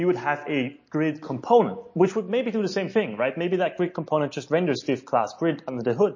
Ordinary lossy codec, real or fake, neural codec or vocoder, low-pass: MP3, 32 kbps; real; none; 7.2 kHz